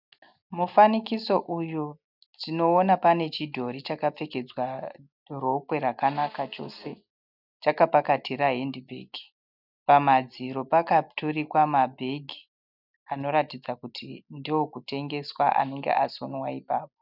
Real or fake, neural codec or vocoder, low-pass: real; none; 5.4 kHz